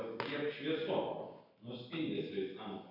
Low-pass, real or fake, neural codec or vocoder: 5.4 kHz; real; none